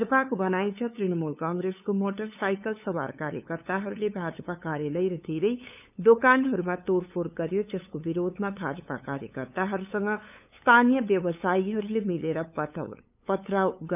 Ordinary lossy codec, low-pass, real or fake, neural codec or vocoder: none; 3.6 kHz; fake; codec, 16 kHz, 8 kbps, FunCodec, trained on LibriTTS, 25 frames a second